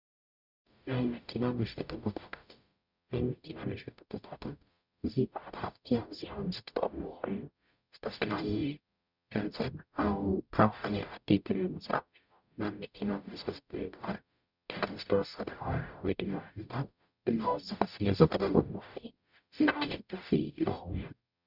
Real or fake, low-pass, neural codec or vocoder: fake; 5.4 kHz; codec, 44.1 kHz, 0.9 kbps, DAC